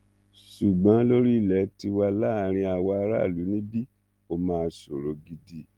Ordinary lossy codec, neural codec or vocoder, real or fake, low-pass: Opus, 24 kbps; none; real; 19.8 kHz